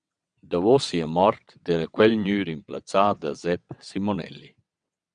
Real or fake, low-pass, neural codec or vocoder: fake; 9.9 kHz; vocoder, 22.05 kHz, 80 mel bands, WaveNeXt